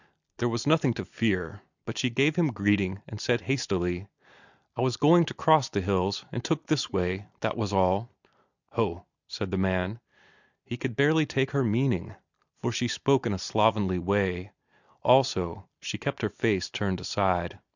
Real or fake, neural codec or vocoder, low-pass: real; none; 7.2 kHz